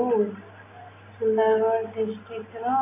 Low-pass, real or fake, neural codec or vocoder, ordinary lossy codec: 3.6 kHz; real; none; AAC, 24 kbps